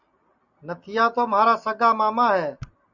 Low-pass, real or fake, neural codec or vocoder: 7.2 kHz; real; none